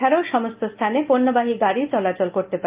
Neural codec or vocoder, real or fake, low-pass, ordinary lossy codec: none; real; 3.6 kHz; Opus, 32 kbps